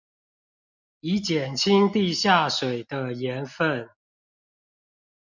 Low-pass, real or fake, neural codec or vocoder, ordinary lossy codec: 7.2 kHz; real; none; MP3, 64 kbps